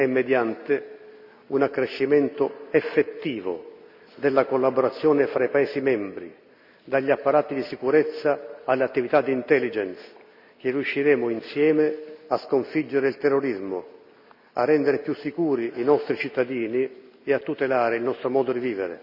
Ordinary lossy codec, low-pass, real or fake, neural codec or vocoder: none; 5.4 kHz; real; none